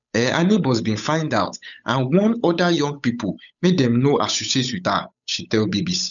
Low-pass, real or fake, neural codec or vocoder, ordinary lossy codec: 7.2 kHz; fake; codec, 16 kHz, 8 kbps, FunCodec, trained on Chinese and English, 25 frames a second; none